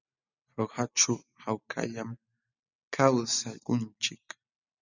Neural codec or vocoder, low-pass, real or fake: none; 7.2 kHz; real